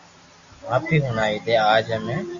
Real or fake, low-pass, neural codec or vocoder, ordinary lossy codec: real; 7.2 kHz; none; Opus, 64 kbps